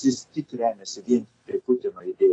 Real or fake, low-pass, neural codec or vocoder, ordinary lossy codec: real; 9.9 kHz; none; AAC, 32 kbps